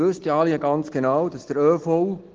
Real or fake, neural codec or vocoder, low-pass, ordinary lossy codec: real; none; 7.2 kHz; Opus, 16 kbps